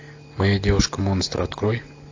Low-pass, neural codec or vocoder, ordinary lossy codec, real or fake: 7.2 kHz; none; AAC, 48 kbps; real